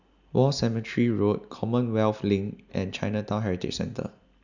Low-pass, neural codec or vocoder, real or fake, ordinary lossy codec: 7.2 kHz; none; real; none